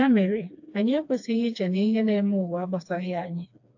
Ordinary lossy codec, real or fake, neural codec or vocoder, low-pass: AAC, 48 kbps; fake; codec, 16 kHz, 2 kbps, FreqCodec, smaller model; 7.2 kHz